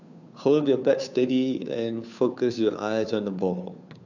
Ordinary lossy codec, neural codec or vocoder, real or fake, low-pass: none; codec, 16 kHz, 2 kbps, FunCodec, trained on Chinese and English, 25 frames a second; fake; 7.2 kHz